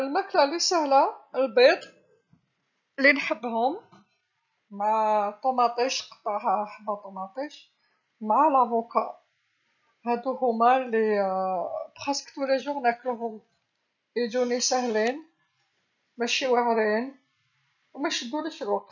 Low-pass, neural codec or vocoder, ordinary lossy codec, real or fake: 7.2 kHz; none; none; real